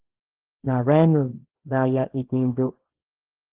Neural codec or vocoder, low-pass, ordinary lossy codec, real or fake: codec, 24 kHz, 0.9 kbps, WavTokenizer, small release; 3.6 kHz; Opus, 16 kbps; fake